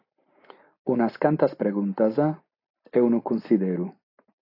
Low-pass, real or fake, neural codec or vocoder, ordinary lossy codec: 5.4 kHz; real; none; AAC, 32 kbps